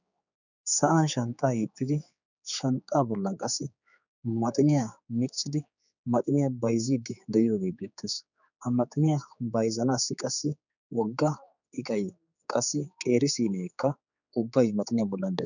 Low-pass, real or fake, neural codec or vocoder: 7.2 kHz; fake; codec, 16 kHz, 4 kbps, X-Codec, HuBERT features, trained on general audio